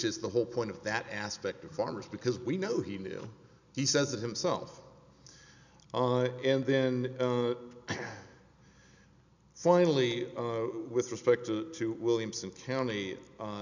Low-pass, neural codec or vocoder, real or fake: 7.2 kHz; none; real